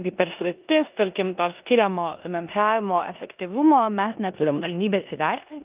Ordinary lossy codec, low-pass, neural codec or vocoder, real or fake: Opus, 24 kbps; 3.6 kHz; codec, 16 kHz in and 24 kHz out, 0.9 kbps, LongCat-Audio-Codec, four codebook decoder; fake